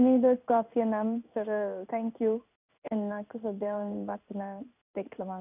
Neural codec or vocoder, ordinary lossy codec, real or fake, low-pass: codec, 16 kHz in and 24 kHz out, 1 kbps, XY-Tokenizer; none; fake; 3.6 kHz